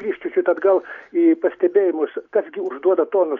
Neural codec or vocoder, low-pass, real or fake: none; 7.2 kHz; real